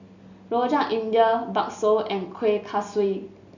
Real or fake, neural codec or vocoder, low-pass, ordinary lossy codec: real; none; 7.2 kHz; none